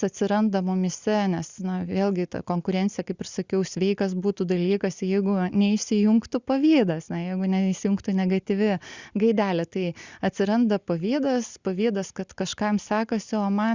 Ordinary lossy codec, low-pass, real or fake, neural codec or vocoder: Opus, 64 kbps; 7.2 kHz; real; none